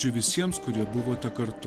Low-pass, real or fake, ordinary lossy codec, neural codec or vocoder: 14.4 kHz; real; Opus, 16 kbps; none